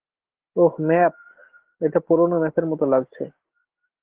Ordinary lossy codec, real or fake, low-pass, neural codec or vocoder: Opus, 16 kbps; real; 3.6 kHz; none